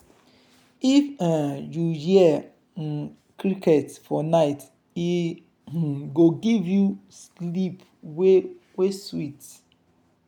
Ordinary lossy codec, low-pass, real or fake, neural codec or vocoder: none; 19.8 kHz; real; none